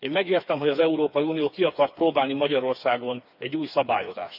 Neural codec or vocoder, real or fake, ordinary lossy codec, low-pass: codec, 16 kHz, 4 kbps, FreqCodec, smaller model; fake; none; 5.4 kHz